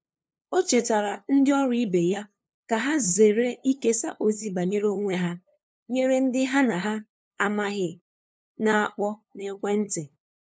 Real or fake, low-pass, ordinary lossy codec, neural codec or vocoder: fake; none; none; codec, 16 kHz, 2 kbps, FunCodec, trained on LibriTTS, 25 frames a second